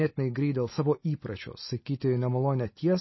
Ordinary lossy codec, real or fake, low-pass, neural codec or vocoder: MP3, 24 kbps; real; 7.2 kHz; none